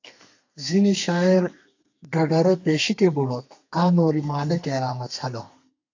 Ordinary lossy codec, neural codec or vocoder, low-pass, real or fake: AAC, 48 kbps; codec, 32 kHz, 1.9 kbps, SNAC; 7.2 kHz; fake